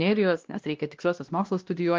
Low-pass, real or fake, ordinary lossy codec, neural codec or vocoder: 7.2 kHz; fake; Opus, 32 kbps; codec, 16 kHz, 1 kbps, X-Codec, WavLM features, trained on Multilingual LibriSpeech